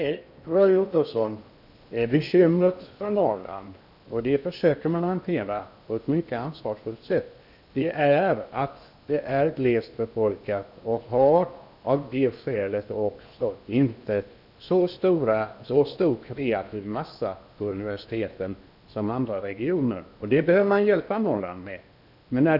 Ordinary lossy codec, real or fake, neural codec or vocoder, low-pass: none; fake; codec, 16 kHz in and 24 kHz out, 0.8 kbps, FocalCodec, streaming, 65536 codes; 5.4 kHz